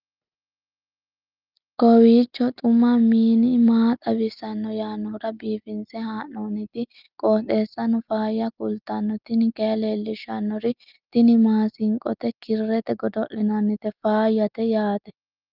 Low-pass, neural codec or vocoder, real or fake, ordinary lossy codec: 5.4 kHz; none; real; Opus, 24 kbps